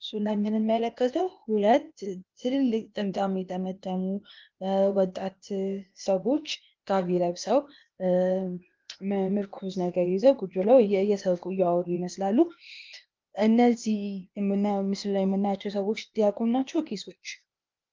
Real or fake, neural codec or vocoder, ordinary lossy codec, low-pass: fake; codec, 16 kHz, 0.8 kbps, ZipCodec; Opus, 24 kbps; 7.2 kHz